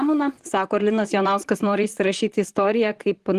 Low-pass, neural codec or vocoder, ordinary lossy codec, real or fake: 14.4 kHz; vocoder, 44.1 kHz, 128 mel bands, Pupu-Vocoder; Opus, 24 kbps; fake